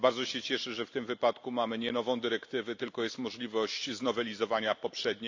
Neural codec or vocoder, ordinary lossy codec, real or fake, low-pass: none; AAC, 48 kbps; real; 7.2 kHz